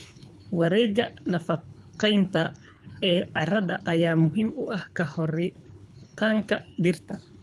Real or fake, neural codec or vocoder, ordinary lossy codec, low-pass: fake; codec, 24 kHz, 3 kbps, HILCodec; none; none